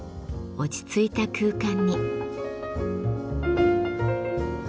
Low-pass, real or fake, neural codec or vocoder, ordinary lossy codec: none; real; none; none